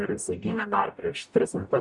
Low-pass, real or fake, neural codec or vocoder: 10.8 kHz; fake; codec, 44.1 kHz, 0.9 kbps, DAC